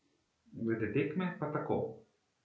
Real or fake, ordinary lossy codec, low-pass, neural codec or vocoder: real; none; none; none